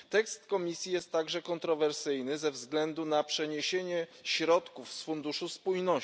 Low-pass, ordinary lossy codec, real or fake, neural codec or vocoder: none; none; real; none